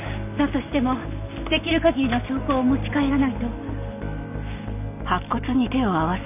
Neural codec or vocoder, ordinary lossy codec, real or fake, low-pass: none; none; real; 3.6 kHz